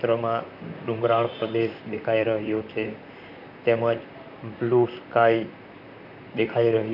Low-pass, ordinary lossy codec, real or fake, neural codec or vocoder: 5.4 kHz; none; fake; vocoder, 44.1 kHz, 128 mel bands, Pupu-Vocoder